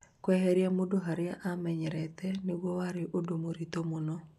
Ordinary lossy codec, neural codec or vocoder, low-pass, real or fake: none; none; 14.4 kHz; real